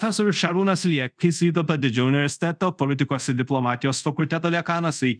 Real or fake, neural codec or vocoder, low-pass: fake; codec, 24 kHz, 0.5 kbps, DualCodec; 9.9 kHz